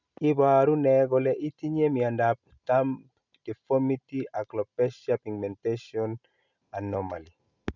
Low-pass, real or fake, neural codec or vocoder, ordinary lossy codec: 7.2 kHz; real; none; none